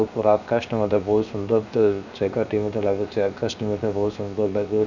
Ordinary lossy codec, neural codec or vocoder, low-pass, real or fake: none; codec, 16 kHz, 0.7 kbps, FocalCodec; 7.2 kHz; fake